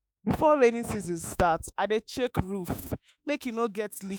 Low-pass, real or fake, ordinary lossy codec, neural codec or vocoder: none; fake; none; autoencoder, 48 kHz, 32 numbers a frame, DAC-VAE, trained on Japanese speech